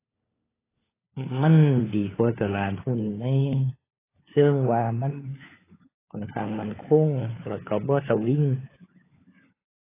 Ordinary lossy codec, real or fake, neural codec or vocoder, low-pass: AAC, 16 kbps; fake; codec, 16 kHz, 4 kbps, FunCodec, trained on LibriTTS, 50 frames a second; 3.6 kHz